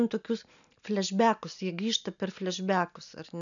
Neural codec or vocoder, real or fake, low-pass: none; real; 7.2 kHz